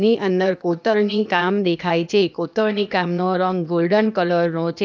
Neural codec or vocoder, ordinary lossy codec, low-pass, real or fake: codec, 16 kHz, 0.8 kbps, ZipCodec; none; none; fake